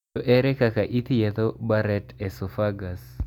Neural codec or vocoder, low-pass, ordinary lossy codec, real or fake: none; 19.8 kHz; none; real